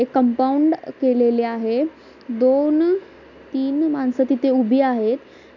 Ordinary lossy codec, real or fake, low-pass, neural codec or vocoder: none; real; 7.2 kHz; none